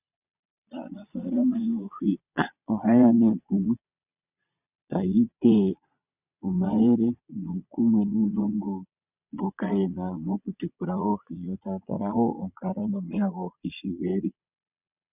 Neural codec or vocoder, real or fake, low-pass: vocoder, 22.05 kHz, 80 mel bands, Vocos; fake; 3.6 kHz